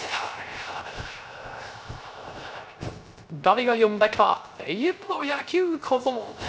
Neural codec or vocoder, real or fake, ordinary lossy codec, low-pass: codec, 16 kHz, 0.3 kbps, FocalCodec; fake; none; none